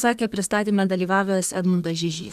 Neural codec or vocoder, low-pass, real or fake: codec, 32 kHz, 1.9 kbps, SNAC; 14.4 kHz; fake